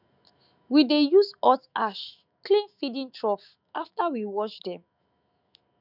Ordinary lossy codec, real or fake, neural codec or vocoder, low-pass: none; fake; autoencoder, 48 kHz, 128 numbers a frame, DAC-VAE, trained on Japanese speech; 5.4 kHz